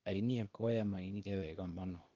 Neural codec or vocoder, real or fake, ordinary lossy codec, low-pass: codec, 16 kHz, 0.8 kbps, ZipCodec; fake; Opus, 24 kbps; 7.2 kHz